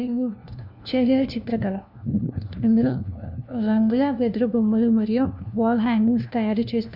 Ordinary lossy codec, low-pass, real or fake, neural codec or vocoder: none; 5.4 kHz; fake; codec, 16 kHz, 1 kbps, FunCodec, trained on LibriTTS, 50 frames a second